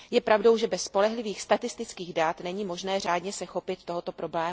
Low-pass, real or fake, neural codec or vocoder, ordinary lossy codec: none; real; none; none